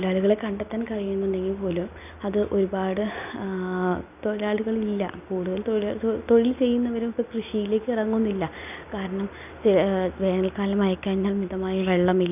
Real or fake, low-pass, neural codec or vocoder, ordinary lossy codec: real; 3.6 kHz; none; none